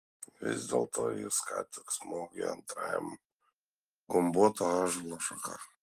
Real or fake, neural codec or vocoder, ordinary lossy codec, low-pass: real; none; Opus, 24 kbps; 14.4 kHz